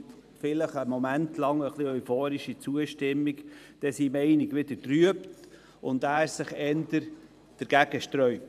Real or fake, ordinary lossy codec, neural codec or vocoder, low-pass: fake; none; vocoder, 44.1 kHz, 128 mel bands every 512 samples, BigVGAN v2; 14.4 kHz